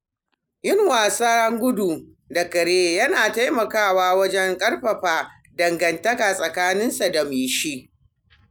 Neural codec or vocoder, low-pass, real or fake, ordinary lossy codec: none; none; real; none